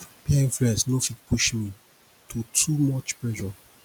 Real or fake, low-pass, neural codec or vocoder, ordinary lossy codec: real; none; none; none